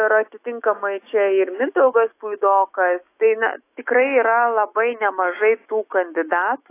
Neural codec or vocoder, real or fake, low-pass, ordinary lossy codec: autoencoder, 48 kHz, 128 numbers a frame, DAC-VAE, trained on Japanese speech; fake; 3.6 kHz; AAC, 24 kbps